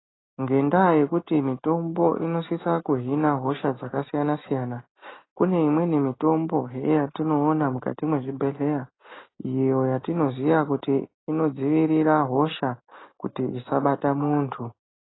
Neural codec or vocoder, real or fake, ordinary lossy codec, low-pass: none; real; AAC, 16 kbps; 7.2 kHz